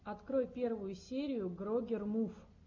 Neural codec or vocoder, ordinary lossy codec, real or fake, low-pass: none; MP3, 64 kbps; real; 7.2 kHz